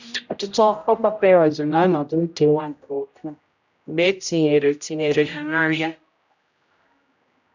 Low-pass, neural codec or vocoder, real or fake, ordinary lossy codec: 7.2 kHz; codec, 16 kHz, 0.5 kbps, X-Codec, HuBERT features, trained on general audio; fake; none